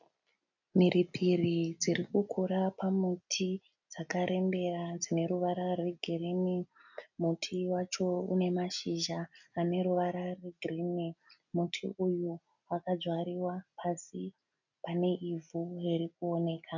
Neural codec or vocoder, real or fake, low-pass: none; real; 7.2 kHz